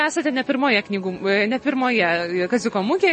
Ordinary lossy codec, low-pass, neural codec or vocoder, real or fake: MP3, 32 kbps; 9.9 kHz; none; real